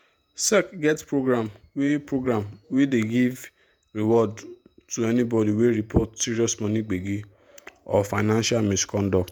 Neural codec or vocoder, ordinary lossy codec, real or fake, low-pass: vocoder, 48 kHz, 128 mel bands, Vocos; none; fake; none